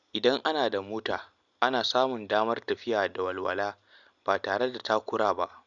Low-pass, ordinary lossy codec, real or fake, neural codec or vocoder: 7.2 kHz; none; real; none